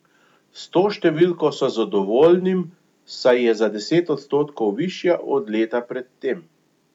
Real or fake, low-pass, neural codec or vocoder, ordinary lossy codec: real; 19.8 kHz; none; none